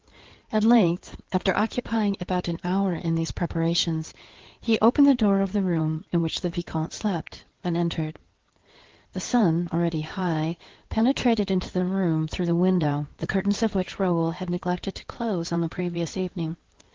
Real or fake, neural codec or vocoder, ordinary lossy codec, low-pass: fake; codec, 16 kHz in and 24 kHz out, 2.2 kbps, FireRedTTS-2 codec; Opus, 16 kbps; 7.2 kHz